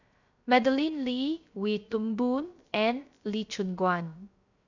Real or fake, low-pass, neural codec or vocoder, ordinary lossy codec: fake; 7.2 kHz; codec, 16 kHz, 0.3 kbps, FocalCodec; none